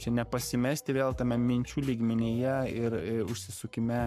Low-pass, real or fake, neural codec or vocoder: 14.4 kHz; fake; codec, 44.1 kHz, 7.8 kbps, Pupu-Codec